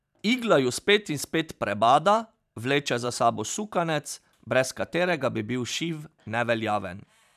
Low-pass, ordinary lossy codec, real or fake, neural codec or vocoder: 14.4 kHz; none; fake; vocoder, 44.1 kHz, 128 mel bands every 512 samples, BigVGAN v2